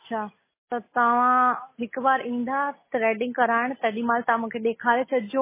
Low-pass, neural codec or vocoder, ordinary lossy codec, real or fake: 3.6 kHz; none; MP3, 16 kbps; real